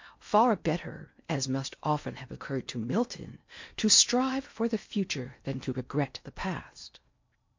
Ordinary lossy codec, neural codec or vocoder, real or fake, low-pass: MP3, 48 kbps; codec, 16 kHz in and 24 kHz out, 0.8 kbps, FocalCodec, streaming, 65536 codes; fake; 7.2 kHz